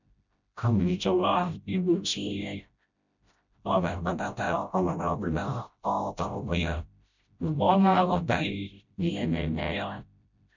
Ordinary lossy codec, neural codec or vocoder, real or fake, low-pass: none; codec, 16 kHz, 0.5 kbps, FreqCodec, smaller model; fake; 7.2 kHz